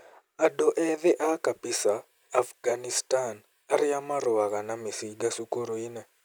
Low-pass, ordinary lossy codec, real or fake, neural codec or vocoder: none; none; real; none